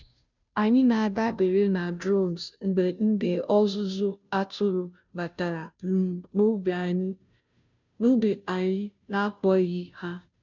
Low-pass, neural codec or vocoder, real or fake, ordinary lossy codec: 7.2 kHz; codec, 16 kHz, 0.5 kbps, FunCodec, trained on Chinese and English, 25 frames a second; fake; none